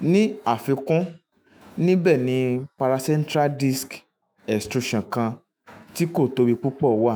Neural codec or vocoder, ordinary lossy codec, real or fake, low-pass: autoencoder, 48 kHz, 128 numbers a frame, DAC-VAE, trained on Japanese speech; none; fake; none